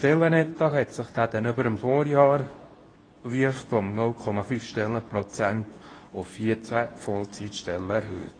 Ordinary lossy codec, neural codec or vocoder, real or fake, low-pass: AAC, 32 kbps; codec, 24 kHz, 0.9 kbps, WavTokenizer, medium speech release version 2; fake; 9.9 kHz